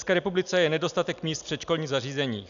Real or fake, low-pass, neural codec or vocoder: real; 7.2 kHz; none